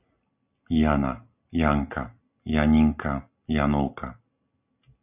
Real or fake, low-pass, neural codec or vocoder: real; 3.6 kHz; none